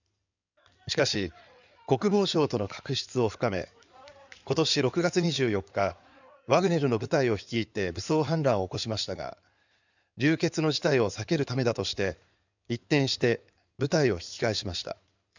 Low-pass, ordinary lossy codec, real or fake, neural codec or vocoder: 7.2 kHz; none; fake; codec, 16 kHz in and 24 kHz out, 2.2 kbps, FireRedTTS-2 codec